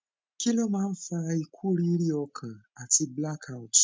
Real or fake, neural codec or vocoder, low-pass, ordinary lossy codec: real; none; none; none